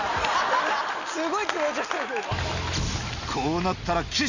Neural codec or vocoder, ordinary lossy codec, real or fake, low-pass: none; Opus, 64 kbps; real; 7.2 kHz